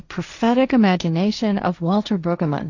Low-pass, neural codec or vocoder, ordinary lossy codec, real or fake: 7.2 kHz; codec, 16 kHz, 1.1 kbps, Voila-Tokenizer; AAC, 48 kbps; fake